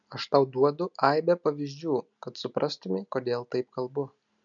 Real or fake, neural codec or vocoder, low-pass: real; none; 7.2 kHz